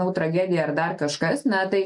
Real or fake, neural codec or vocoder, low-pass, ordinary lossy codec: real; none; 10.8 kHz; MP3, 64 kbps